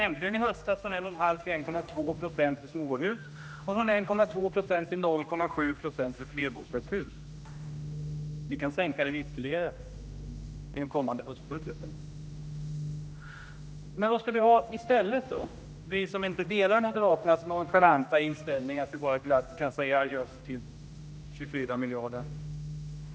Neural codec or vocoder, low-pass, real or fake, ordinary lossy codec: codec, 16 kHz, 1 kbps, X-Codec, HuBERT features, trained on general audio; none; fake; none